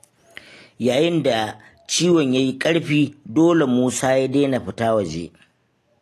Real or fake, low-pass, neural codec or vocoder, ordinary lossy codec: real; 14.4 kHz; none; AAC, 48 kbps